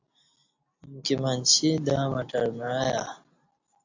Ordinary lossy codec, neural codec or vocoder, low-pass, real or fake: AAC, 48 kbps; none; 7.2 kHz; real